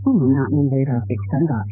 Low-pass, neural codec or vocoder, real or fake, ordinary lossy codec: 3.6 kHz; codec, 16 kHz, 2 kbps, X-Codec, HuBERT features, trained on balanced general audio; fake; none